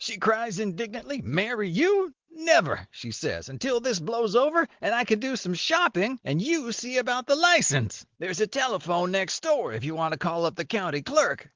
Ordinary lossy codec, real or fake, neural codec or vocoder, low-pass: Opus, 16 kbps; real; none; 7.2 kHz